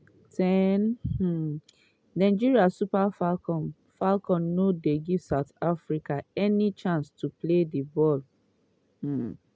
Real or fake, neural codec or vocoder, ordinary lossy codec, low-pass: real; none; none; none